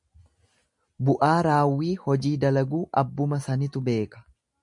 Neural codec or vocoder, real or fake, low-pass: none; real; 10.8 kHz